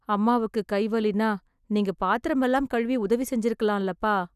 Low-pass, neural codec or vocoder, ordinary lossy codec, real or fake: 14.4 kHz; none; none; real